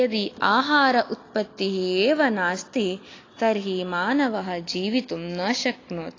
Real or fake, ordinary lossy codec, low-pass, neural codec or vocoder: real; AAC, 32 kbps; 7.2 kHz; none